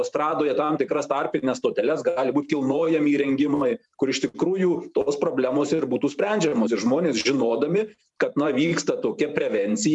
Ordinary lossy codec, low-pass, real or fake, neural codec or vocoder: MP3, 96 kbps; 10.8 kHz; fake; vocoder, 44.1 kHz, 128 mel bands every 512 samples, BigVGAN v2